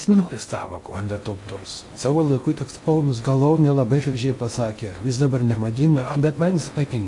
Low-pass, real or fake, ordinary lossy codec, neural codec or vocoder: 10.8 kHz; fake; AAC, 64 kbps; codec, 16 kHz in and 24 kHz out, 0.6 kbps, FocalCodec, streaming, 2048 codes